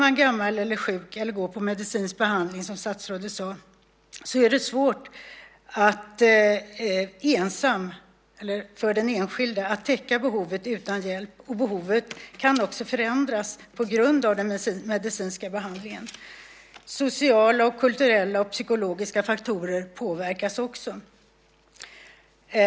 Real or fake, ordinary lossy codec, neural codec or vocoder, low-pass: real; none; none; none